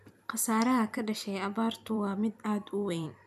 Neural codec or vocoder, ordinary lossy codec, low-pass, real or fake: vocoder, 44.1 kHz, 128 mel bands every 256 samples, BigVGAN v2; none; 14.4 kHz; fake